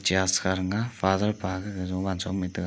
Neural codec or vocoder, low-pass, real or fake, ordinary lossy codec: none; none; real; none